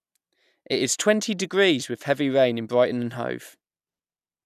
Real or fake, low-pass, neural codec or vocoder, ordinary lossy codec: real; 14.4 kHz; none; none